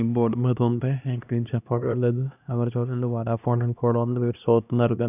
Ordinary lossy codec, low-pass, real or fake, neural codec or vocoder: none; 3.6 kHz; fake; codec, 16 kHz, 1 kbps, X-Codec, HuBERT features, trained on LibriSpeech